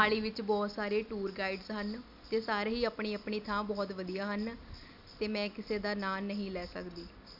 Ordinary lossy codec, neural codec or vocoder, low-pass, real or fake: AAC, 48 kbps; none; 5.4 kHz; real